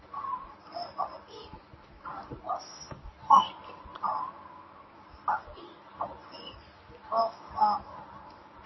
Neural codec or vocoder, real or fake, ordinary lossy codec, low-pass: codec, 24 kHz, 0.9 kbps, WavTokenizer, medium speech release version 1; fake; MP3, 24 kbps; 7.2 kHz